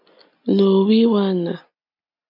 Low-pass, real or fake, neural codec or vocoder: 5.4 kHz; real; none